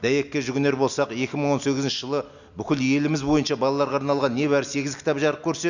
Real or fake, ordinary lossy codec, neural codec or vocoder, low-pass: real; none; none; 7.2 kHz